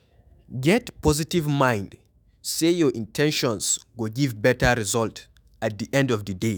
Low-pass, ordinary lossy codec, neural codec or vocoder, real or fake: none; none; autoencoder, 48 kHz, 128 numbers a frame, DAC-VAE, trained on Japanese speech; fake